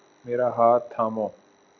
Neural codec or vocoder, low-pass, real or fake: none; 7.2 kHz; real